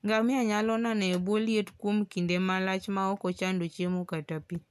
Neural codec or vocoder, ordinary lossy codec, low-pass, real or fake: none; none; 14.4 kHz; real